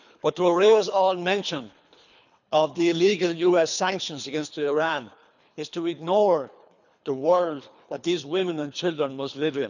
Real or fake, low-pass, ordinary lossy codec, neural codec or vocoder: fake; 7.2 kHz; none; codec, 24 kHz, 3 kbps, HILCodec